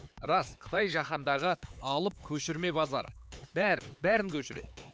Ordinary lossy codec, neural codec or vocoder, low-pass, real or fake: none; codec, 16 kHz, 4 kbps, X-Codec, HuBERT features, trained on LibriSpeech; none; fake